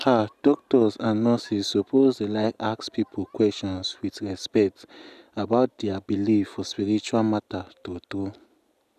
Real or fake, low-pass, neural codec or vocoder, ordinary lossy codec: real; 14.4 kHz; none; none